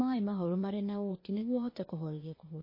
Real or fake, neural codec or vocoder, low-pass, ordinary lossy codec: fake; codec, 16 kHz, 0.8 kbps, ZipCodec; 5.4 kHz; MP3, 24 kbps